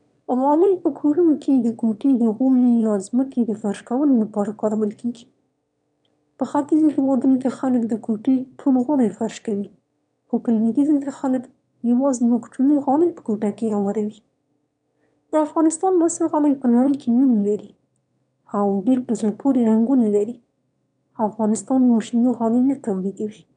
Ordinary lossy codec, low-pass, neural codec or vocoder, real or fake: none; 9.9 kHz; autoencoder, 22.05 kHz, a latent of 192 numbers a frame, VITS, trained on one speaker; fake